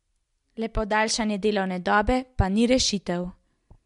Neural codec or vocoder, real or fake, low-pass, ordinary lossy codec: none; real; 10.8 kHz; MP3, 64 kbps